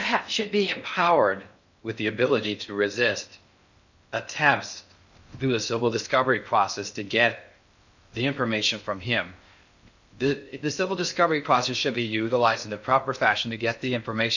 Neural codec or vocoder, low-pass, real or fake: codec, 16 kHz in and 24 kHz out, 0.6 kbps, FocalCodec, streaming, 4096 codes; 7.2 kHz; fake